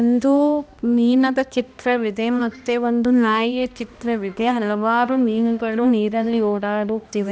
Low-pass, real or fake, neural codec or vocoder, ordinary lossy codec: none; fake; codec, 16 kHz, 1 kbps, X-Codec, HuBERT features, trained on balanced general audio; none